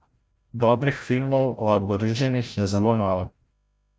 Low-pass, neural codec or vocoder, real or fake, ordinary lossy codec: none; codec, 16 kHz, 0.5 kbps, FreqCodec, larger model; fake; none